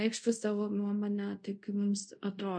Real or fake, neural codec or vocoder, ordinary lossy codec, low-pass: fake; codec, 24 kHz, 0.5 kbps, DualCodec; MP3, 64 kbps; 9.9 kHz